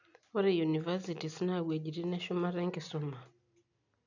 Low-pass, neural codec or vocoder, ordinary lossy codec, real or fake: 7.2 kHz; none; none; real